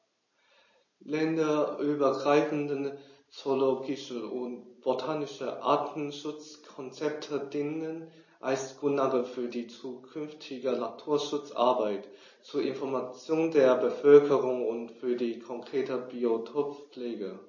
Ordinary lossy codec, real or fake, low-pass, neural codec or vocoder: MP3, 32 kbps; real; 7.2 kHz; none